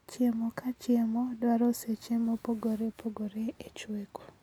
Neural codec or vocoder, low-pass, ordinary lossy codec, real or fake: none; 19.8 kHz; none; real